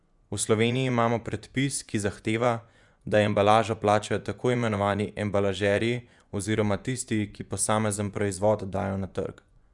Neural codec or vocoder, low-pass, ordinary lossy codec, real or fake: vocoder, 24 kHz, 100 mel bands, Vocos; 10.8 kHz; none; fake